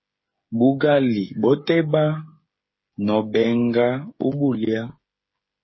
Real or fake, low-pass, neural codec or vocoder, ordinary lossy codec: fake; 7.2 kHz; codec, 16 kHz, 8 kbps, FreqCodec, smaller model; MP3, 24 kbps